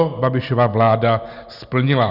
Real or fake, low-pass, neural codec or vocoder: real; 5.4 kHz; none